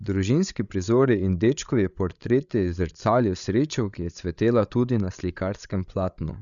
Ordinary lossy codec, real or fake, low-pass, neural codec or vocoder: none; fake; 7.2 kHz; codec, 16 kHz, 8 kbps, FreqCodec, larger model